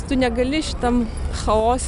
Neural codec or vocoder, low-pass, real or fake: none; 10.8 kHz; real